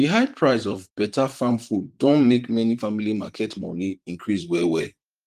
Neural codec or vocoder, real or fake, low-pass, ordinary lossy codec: vocoder, 44.1 kHz, 128 mel bands, Pupu-Vocoder; fake; 14.4 kHz; Opus, 24 kbps